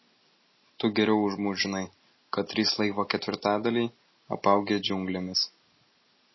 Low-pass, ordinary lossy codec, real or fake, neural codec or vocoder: 7.2 kHz; MP3, 24 kbps; real; none